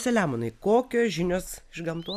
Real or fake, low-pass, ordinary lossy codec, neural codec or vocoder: real; 14.4 kHz; AAC, 96 kbps; none